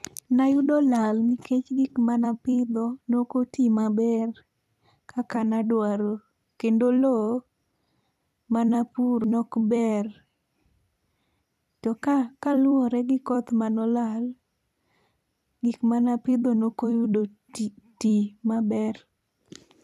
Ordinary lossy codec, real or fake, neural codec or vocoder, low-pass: none; fake; vocoder, 44.1 kHz, 128 mel bands every 256 samples, BigVGAN v2; 14.4 kHz